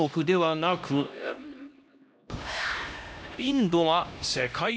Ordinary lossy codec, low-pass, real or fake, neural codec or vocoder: none; none; fake; codec, 16 kHz, 1 kbps, X-Codec, HuBERT features, trained on LibriSpeech